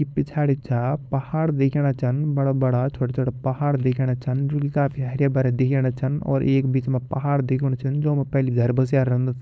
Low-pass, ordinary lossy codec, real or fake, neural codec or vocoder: none; none; fake; codec, 16 kHz, 4.8 kbps, FACodec